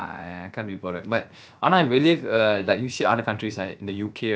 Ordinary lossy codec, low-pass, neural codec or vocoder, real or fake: none; none; codec, 16 kHz, about 1 kbps, DyCAST, with the encoder's durations; fake